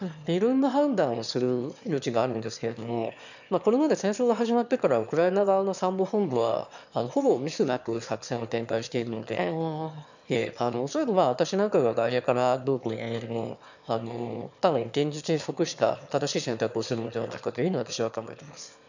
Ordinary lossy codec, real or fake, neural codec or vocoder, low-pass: none; fake; autoencoder, 22.05 kHz, a latent of 192 numbers a frame, VITS, trained on one speaker; 7.2 kHz